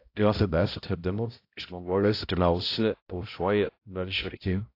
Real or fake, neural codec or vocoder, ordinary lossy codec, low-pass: fake; codec, 16 kHz, 0.5 kbps, X-Codec, HuBERT features, trained on balanced general audio; AAC, 32 kbps; 5.4 kHz